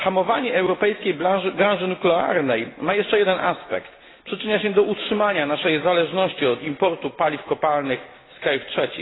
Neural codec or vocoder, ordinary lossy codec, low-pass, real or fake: none; AAC, 16 kbps; 7.2 kHz; real